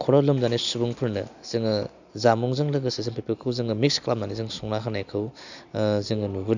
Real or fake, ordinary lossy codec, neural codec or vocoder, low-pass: real; none; none; 7.2 kHz